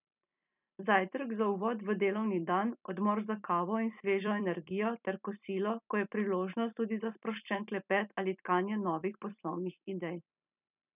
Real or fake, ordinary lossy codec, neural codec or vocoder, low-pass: fake; none; vocoder, 44.1 kHz, 128 mel bands every 256 samples, BigVGAN v2; 3.6 kHz